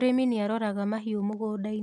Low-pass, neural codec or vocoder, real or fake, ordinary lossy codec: none; none; real; none